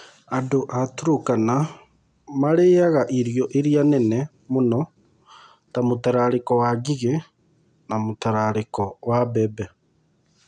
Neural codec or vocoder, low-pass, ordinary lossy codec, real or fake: none; 9.9 kHz; none; real